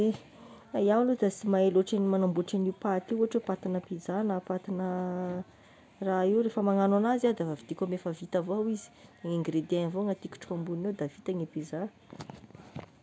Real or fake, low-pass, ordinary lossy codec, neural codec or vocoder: real; none; none; none